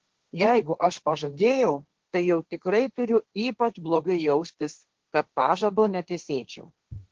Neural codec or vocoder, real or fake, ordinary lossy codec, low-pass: codec, 16 kHz, 1.1 kbps, Voila-Tokenizer; fake; Opus, 16 kbps; 7.2 kHz